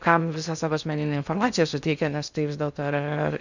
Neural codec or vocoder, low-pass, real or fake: codec, 16 kHz in and 24 kHz out, 0.6 kbps, FocalCodec, streaming, 2048 codes; 7.2 kHz; fake